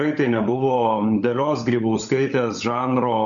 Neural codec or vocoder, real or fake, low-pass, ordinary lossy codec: codec, 16 kHz, 16 kbps, FunCodec, trained on LibriTTS, 50 frames a second; fake; 7.2 kHz; MP3, 64 kbps